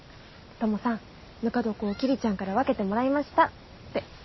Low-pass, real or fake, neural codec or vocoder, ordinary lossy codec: 7.2 kHz; real; none; MP3, 24 kbps